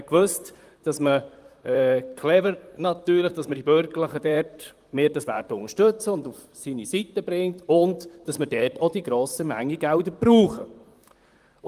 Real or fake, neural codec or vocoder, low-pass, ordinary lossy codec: fake; vocoder, 44.1 kHz, 128 mel bands, Pupu-Vocoder; 14.4 kHz; Opus, 32 kbps